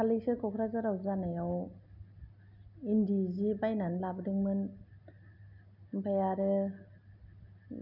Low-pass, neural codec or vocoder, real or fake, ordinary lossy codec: 5.4 kHz; none; real; none